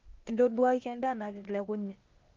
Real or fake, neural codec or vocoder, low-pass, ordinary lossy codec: fake; codec, 16 kHz, 0.8 kbps, ZipCodec; 7.2 kHz; Opus, 32 kbps